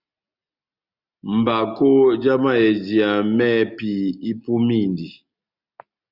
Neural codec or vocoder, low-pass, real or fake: none; 5.4 kHz; real